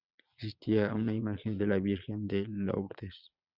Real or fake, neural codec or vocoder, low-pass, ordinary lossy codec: fake; vocoder, 22.05 kHz, 80 mel bands, Vocos; 5.4 kHz; Opus, 64 kbps